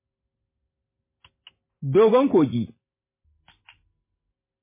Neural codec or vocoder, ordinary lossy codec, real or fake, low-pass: none; MP3, 16 kbps; real; 3.6 kHz